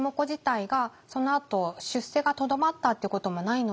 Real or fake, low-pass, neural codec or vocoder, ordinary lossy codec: real; none; none; none